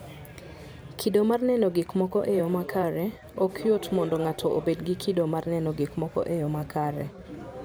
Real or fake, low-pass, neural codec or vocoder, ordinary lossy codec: fake; none; vocoder, 44.1 kHz, 128 mel bands every 512 samples, BigVGAN v2; none